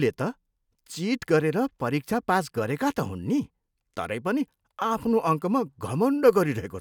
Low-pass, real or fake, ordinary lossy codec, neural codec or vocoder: 19.8 kHz; real; none; none